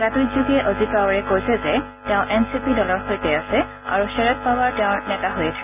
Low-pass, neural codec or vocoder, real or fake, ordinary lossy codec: 3.6 kHz; none; real; none